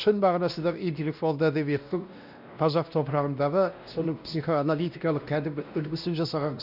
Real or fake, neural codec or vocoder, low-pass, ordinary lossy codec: fake; codec, 16 kHz, 1 kbps, X-Codec, WavLM features, trained on Multilingual LibriSpeech; 5.4 kHz; none